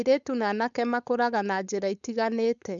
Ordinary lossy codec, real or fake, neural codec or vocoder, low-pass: none; fake; codec, 16 kHz, 4.8 kbps, FACodec; 7.2 kHz